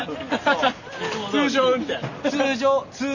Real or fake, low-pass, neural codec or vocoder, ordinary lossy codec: real; 7.2 kHz; none; none